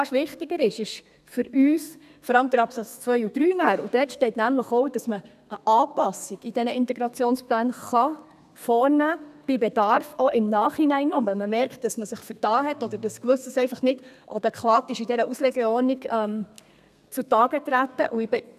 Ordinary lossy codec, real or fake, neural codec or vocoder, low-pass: none; fake; codec, 32 kHz, 1.9 kbps, SNAC; 14.4 kHz